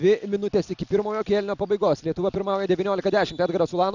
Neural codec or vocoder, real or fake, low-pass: none; real; 7.2 kHz